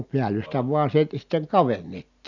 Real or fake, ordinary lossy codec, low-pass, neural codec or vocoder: real; none; 7.2 kHz; none